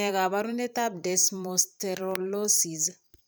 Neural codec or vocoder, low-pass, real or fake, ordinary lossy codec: vocoder, 44.1 kHz, 128 mel bands every 512 samples, BigVGAN v2; none; fake; none